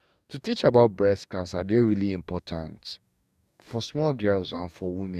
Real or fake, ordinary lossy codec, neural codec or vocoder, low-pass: fake; none; codec, 44.1 kHz, 2.6 kbps, DAC; 14.4 kHz